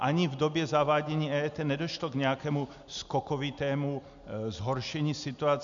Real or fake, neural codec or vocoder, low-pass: real; none; 7.2 kHz